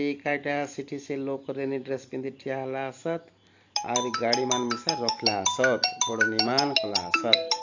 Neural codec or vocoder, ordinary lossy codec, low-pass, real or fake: vocoder, 44.1 kHz, 128 mel bands every 512 samples, BigVGAN v2; AAC, 48 kbps; 7.2 kHz; fake